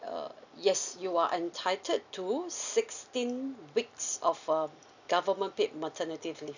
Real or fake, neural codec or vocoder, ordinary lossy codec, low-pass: real; none; none; 7.2 kHz